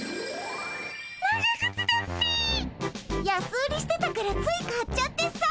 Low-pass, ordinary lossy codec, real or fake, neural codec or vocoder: none; none; real; none